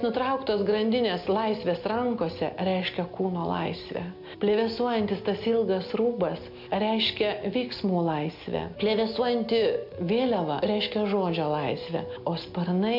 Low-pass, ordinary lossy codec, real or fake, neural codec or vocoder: 5.4 kHz; MP3, 48 kbps; real; none